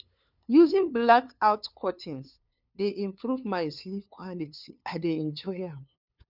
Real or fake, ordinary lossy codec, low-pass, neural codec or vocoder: fake; none; 5.4 kHz; codec, 16 kHz, 2 kbps, FunCodec, trained on LibriTTS, 25 frames a second